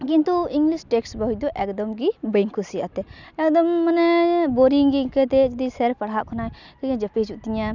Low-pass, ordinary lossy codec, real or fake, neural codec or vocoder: 7.2 kHz; none; real; none